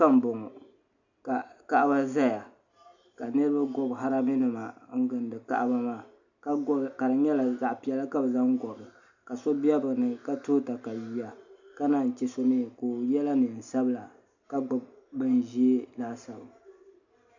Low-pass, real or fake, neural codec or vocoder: 7.2 kHz; real; none